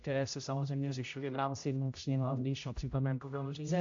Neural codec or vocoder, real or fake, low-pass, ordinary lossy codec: codec, 16 kHz, 0.5 kbps, X-Codec, HuBERT features, trained on general audio; fake; 7.2 kHz; AAC, 48 kbps